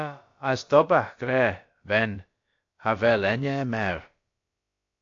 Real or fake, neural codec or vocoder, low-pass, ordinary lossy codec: fake; codec, 16 kHz, about 1 kbps, DyCAST, with the encoder's durations; 7.2 kHz; AAC, 48 kbps